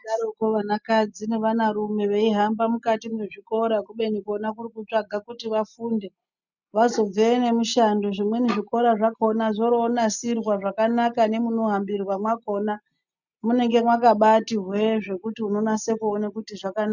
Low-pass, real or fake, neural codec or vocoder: 7.2 kHz; real; none